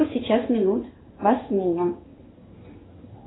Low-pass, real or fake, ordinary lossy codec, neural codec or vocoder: 7.2 kHz; fake; AAC, 16 kbps; codec, 16 kHz in and 24 kHz out, 1 kbps, XY-Tokenizer